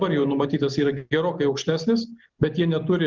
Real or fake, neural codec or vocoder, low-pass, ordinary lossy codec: real; none; 7.2 kHz; Opus, 32 kbps